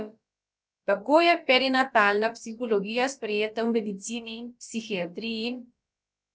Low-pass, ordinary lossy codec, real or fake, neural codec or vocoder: none; none; fake; codec, 16 kHz, about 1 kbps, DyCAST, with the encoder's durations